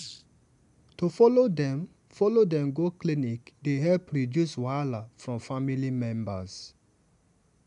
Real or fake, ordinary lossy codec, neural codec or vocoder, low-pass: real; AAC, 96 kbps; none; 10.8 kHz